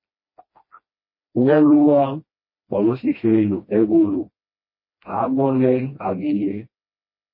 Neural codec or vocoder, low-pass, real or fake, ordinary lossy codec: codec, 16 kHz, 1 kbps, FreqCodec, smaller model; 5.4 kHz; fake; MP3, 24 kbps